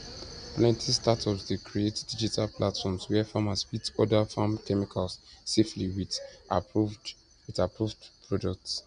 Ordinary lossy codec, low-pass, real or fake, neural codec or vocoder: MP3, 96 kbps; 9.9 kHz; real; none